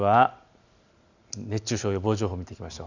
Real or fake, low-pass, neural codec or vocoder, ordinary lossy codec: real; 7.2 kHz; none; none